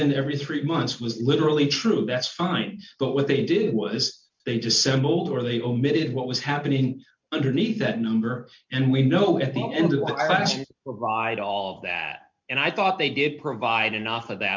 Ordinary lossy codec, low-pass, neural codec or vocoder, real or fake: MP3, 64 kbps; 7.2 kHz; none; real